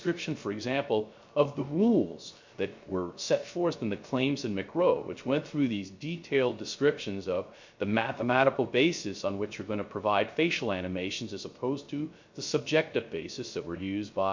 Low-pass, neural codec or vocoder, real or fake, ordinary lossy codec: 7.2 kHz; codec, 16 kHz, 0.3 kbps, FocalCodec; fake; MP3, 48 kbps